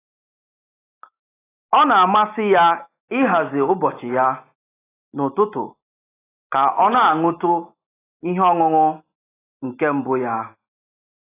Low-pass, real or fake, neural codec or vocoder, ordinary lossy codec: 3.6 kHz; real; none; AAC, 16 kbps